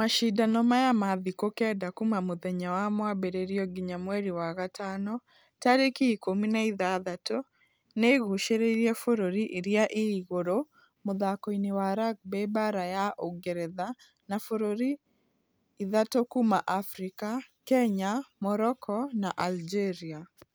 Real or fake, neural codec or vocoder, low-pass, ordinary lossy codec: real; none; none; none